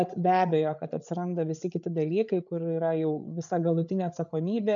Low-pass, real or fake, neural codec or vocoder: 7.2 kHz; fake; codec, 16 kHz, 8 kbps, FreqCodec, larger model